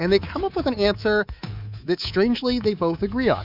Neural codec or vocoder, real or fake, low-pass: codec, 24 kHz, 3.1 kbps, DualCodec; fake; 5.4 kHz